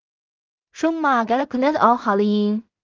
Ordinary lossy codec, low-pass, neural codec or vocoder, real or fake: Opus, 24 kbps; 7.2 kHz; codec, 16 kHz in and 24 kHz out, 0.4 kbps, LongCat-Audio-Codec, two codebook decoder; fake